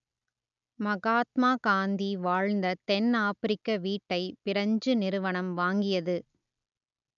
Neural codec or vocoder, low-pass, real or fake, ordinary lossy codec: none; 7.2 kHz; real; none